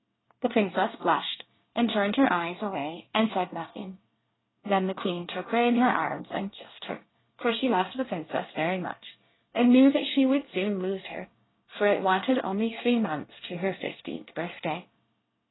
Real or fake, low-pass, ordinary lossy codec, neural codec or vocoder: fake; 7.2 kHz; AAC, 16 kbps; codec, 24 kHz, 1 kbps, SNAC